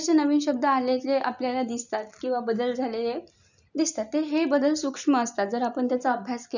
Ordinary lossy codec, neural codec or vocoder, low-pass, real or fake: none; none; 7.2 kHz; real